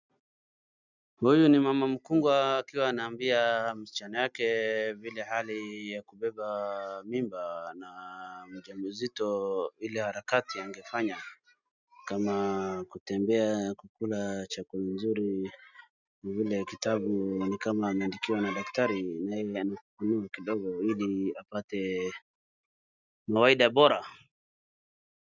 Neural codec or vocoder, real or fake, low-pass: none; real; 7.2 kHz